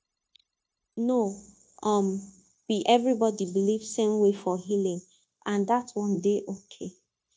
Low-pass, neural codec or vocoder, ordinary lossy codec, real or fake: none; codec, 16 kHz, 0.9 kbps, LongCat-Audio-Codec; none; fake